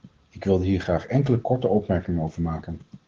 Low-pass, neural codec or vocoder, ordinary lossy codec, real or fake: 7.2 kHz; none; Opus, 16 kbps; real